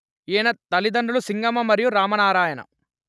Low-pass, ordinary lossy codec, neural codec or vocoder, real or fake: none; none; none; real